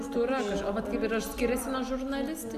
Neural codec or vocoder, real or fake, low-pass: none; real; 14.4 kHz